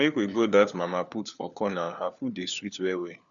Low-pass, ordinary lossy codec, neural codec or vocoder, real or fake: 7.2 kHz; none; codec, 16 kHz, 4 kbps, FunCodec, trained on LibriTTS, 50 frames a second; fake